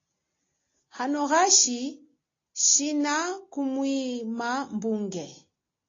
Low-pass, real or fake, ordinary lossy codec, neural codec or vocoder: 7.2 kHz; real; AAC, 32 kbps; none